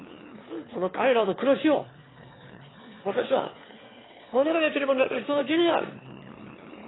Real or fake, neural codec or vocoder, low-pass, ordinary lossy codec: fake; autoencoder, 22.05 kHz, a latent of 192 numbers a frame, VITS, trained on one speaker; 7.2 kHz; AAC, 16 kbps